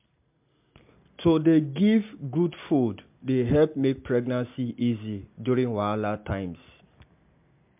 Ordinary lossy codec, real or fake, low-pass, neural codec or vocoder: MP3, 32 kbps; real; 3.6 kHz; none